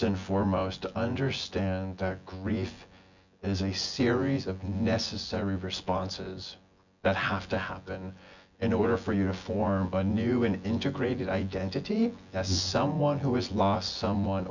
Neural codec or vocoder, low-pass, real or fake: vocoder, 24 kHz, 100 mel bands, Vocos; 7.2 kHz; fake